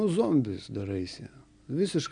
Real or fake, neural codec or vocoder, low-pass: real; none; 9.9 kHz